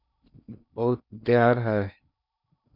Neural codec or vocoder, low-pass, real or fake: codec, 16 kHz in and 24 kHz out, 0.8 kbps, FocalCodec, streaming, 65536 codes; 5.4 kHz; fake